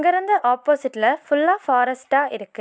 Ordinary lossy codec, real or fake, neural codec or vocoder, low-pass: none; real; none; none